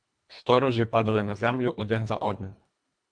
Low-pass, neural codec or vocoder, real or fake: 9.9 kHz; codec, 24 kHz, 1.5 kbps, HILCodec; fake